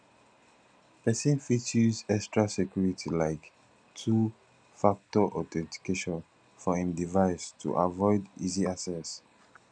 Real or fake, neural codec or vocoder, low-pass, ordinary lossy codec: real; none; 9.9 kHz; none